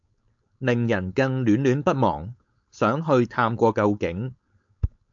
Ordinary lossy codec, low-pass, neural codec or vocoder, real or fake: AAC, 64 kbps; 7.2 kHz; codec, 16 kHz, 4.8 kbps, FACodec; fake